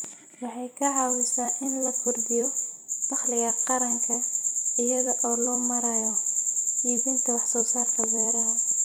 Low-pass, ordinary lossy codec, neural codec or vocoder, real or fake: none; none; vocoder, 44.1 kHz, 128 mel bands every 256 samples, BigVGAN v2; fake